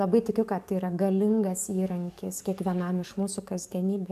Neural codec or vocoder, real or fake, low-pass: codec, 44.1 kHz, 7.8 kbps, DAC; fake; 14.4 kHz